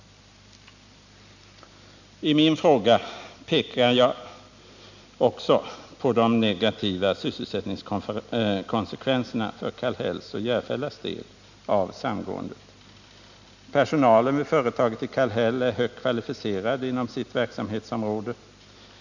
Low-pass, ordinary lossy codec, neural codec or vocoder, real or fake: 7.2 kHz; none; none; real